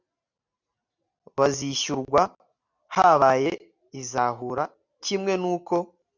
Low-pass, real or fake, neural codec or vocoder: 7.2 kHz; real; none